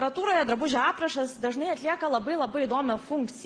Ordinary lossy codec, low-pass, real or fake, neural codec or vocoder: Opus, 16 kbps; 9.9 kHz; real; none